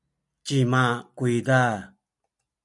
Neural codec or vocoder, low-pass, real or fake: none; 10.8 kHz; real